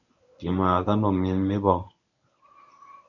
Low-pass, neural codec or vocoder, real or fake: 7.2 kHz; codec, 24 kHz, 0.9 kbps, WavTokenizer, medium speech release version 1; fake